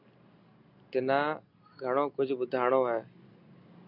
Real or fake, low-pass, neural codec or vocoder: real; 5.4 kHz; none